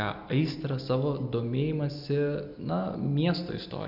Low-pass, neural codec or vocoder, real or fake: 5.4 kHz; none; real